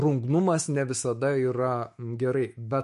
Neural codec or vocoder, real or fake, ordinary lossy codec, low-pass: autoencoder, 48 kHz, 128 numbers a frame, DAC-VAE, trained on Japanese speech; fake; MP3, 48 kbps; 14.4 kHz